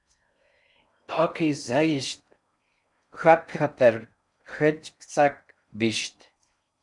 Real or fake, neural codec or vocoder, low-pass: fake; codec, 16 kHz in and 24 kHz out, 0.6 kbps, FocalCodec, streaming, 4096 codes; 10.8 kHz